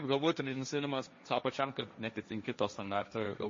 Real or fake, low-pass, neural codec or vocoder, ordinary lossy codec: fake; 7.2 kHz; codec, 16 kHz, 1.1 kbps, Voila-Tokenizer; MP3, 32 kbps